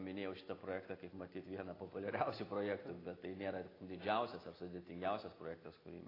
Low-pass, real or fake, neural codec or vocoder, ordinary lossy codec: 5.4 kHz; real; none; AAC, 24 kbps